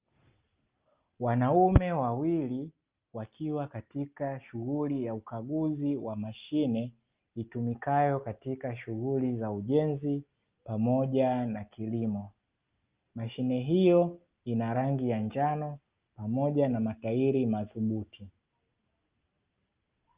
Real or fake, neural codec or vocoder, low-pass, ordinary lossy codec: real; none; 3.6 kHz; Opus, 24 kbps